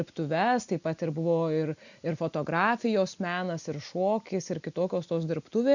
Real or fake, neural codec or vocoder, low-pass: real; none; 7.2 kHz